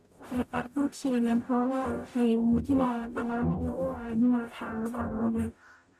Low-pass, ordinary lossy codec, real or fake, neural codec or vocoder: 14.4 kHz; none; fake; codec, 44.1 kHz, 0.9 kbps, DAC